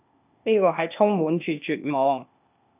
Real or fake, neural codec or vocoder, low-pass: fake; codec, 16 kHz, 0.8 kbps, ZipCodec; 3.6 kHz